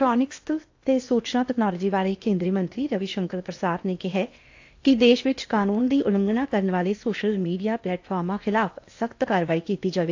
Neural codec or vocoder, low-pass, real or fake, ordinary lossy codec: codec, 16 kHz in and 24 kHz out, 0.8 kbps, FocalCodec, streaming, 65536 codes; 7.2 kHz; fake; AAC, 48 kbps